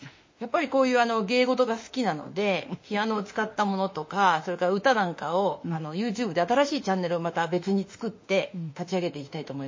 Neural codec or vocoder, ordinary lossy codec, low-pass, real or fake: autoencoder, 48 kHz, 32 numbers a frame, DAC-VAE, trained on Japanese speech; MP3, 32 kbps; 7.2 kHz; fake